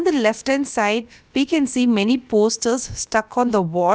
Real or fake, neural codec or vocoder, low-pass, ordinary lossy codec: fake; codec, 16 kHz, about 1 kbps, DyCAST, with the encoder's durations; none; none